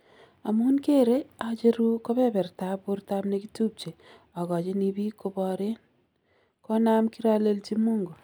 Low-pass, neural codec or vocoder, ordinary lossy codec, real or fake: none; none; none; real